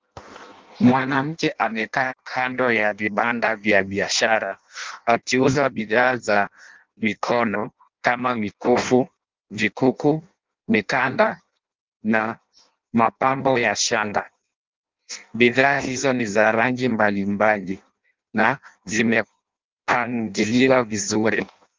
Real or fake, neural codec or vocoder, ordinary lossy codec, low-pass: fake; codec, 16 kHz in and 24 kHz out, 0.6 kbps, FireRedTTS-2 codec; Opus, 24 kbps; 7.2 kHz